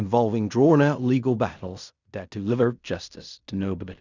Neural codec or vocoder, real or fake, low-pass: codec, 16 kHz in and 24 kHz out, 0.4 kbps, LongCat-Audio-Codec, fine tuned four codebook decoder; fake; 7.2 kHz